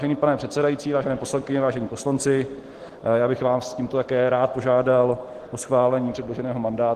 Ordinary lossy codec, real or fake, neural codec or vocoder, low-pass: Opus, 16 kbps; real; none; 14.4 kHz